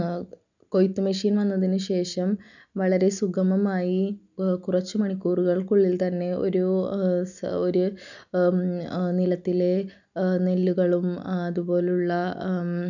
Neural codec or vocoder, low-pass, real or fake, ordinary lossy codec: none; 7.2 kHz; real; none